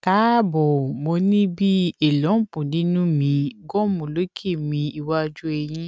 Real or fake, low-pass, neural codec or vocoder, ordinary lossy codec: real; none; none; none